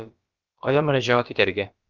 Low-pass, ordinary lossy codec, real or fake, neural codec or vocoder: 7.2 kHz; Opus, 24 kbps; fake; codec, 16 kHz, about 1 kbps, DyCAST, with the encoder's durations